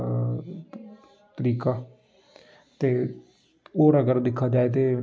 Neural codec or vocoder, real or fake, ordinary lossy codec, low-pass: none; real; none; none